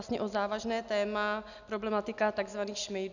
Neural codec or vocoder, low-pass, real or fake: none; 7.2 kHz; real